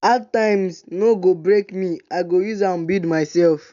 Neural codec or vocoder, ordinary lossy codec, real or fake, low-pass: none; none; real; 7.2 kHz